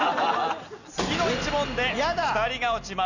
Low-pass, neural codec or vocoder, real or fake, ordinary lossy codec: 7.2 kHz; none; real; none